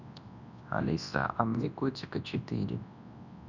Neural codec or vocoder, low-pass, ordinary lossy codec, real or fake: codec, 24 kHz, 0.9 kbps, WavTokenizer, large speech release; 7.2 kHz; none; fake